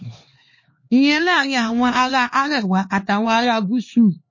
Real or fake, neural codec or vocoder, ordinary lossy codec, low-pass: fake; codec, 16 kHz, 2 kbps, X-Codec, HuBERT features, trained on LibriSpeech; MP3, 32 kbps; 7.2 kHz